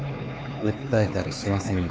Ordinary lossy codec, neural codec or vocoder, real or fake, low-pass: none; codec, 16 kHz, 4 kbps, X-Codec, HuBERT features, trained on LibriSpeech; fake; none